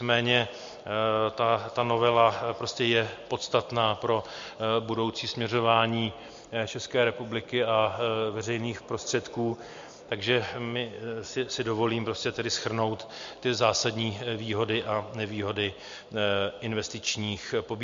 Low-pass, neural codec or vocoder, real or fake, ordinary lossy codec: 7.2 kHz; none; real; MP3, 48 kbps